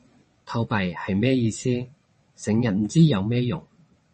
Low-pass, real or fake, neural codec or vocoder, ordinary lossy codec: 9.9 kHz; fake; vocoder, 22.05 kHz, 80 mel bands, WaveNeXt; MP3, 32 kbps